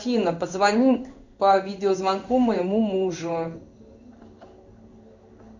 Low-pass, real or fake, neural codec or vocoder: 7.2 kHz; fake; codec, 16 kHz in and 24 kHz out, 1 kbps, XY-Tokenizer